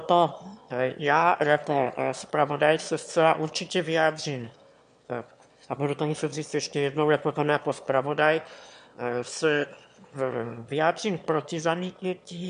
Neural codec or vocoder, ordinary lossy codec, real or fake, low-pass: autoencoder, 22.05 kHz, a latent of 192 numbers a frame, VITS, trained on one speaker; MP3, 64 kbps; fake; 9.9 kHz